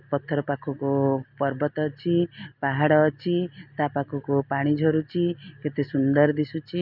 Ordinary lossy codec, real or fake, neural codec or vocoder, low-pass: none; real; none; 5.4 kHz